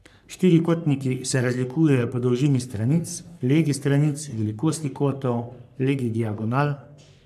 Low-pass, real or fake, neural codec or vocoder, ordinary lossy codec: 14.4 kHz; fake; codec, 44.1 kHz, 3.4 kbps, Pupu-Codec; none